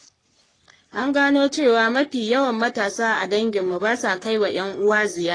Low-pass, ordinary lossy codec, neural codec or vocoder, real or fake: 9.9 kHz; AAC, 32 kbps; codec, 44.1 kHz, 3.4 kbps, Pupu-Codec; fake